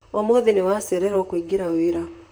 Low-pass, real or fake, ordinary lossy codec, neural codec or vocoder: none; fake; none; vocoder, 44.1 kHz, 128 mel bands, Pupu-Vocoder